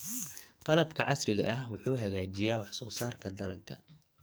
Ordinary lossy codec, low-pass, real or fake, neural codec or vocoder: none; none; fake; codec, 44.1 kHz, 2.6 kbps, SNAC